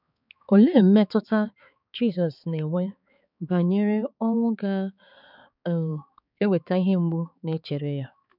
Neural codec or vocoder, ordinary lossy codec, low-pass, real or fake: codec, 16 kHz, 4 kbps, X-Codec, HuBERT features, trained on balanced general audio; none; 5.4 kHz; fake